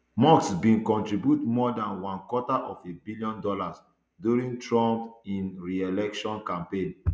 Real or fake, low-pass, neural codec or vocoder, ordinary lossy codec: real; none; none; none